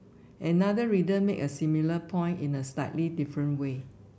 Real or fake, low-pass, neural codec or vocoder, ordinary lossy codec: real; none; none; none